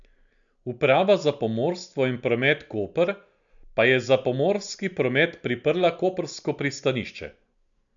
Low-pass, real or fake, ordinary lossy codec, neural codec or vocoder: 7.2 kHz; real; none; none